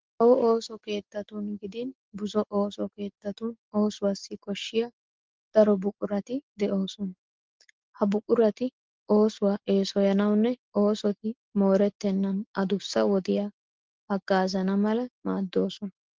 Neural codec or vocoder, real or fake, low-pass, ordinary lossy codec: none; real; 7.2 kHz; Opus, 16 kbps